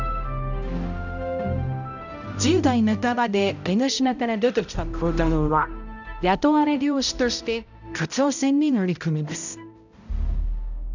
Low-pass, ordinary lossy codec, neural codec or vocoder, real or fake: 7.2 kHz; none; codec, 16 kHz, 0.5 kbps, X-Codec, HuBERT features, trained on balanced general audio; fake